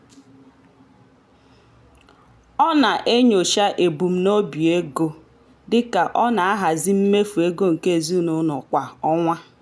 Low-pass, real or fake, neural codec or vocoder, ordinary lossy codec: none; real; none; none